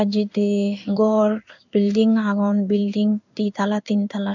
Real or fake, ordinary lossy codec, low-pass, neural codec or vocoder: fake; none; 7.2 kHz; codec, 16 kHz in and 24 kHz out, 1 kbps, XY-Tokenizer